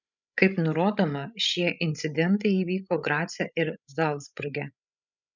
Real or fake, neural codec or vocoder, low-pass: fake; codec, 16 kHz, 16 kbps, FreqCodec, larger model; 7.2 kHz